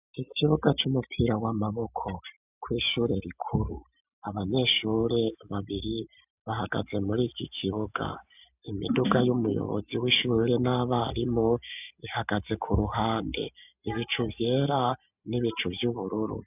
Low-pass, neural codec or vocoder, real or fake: 3.6 kHz; none; real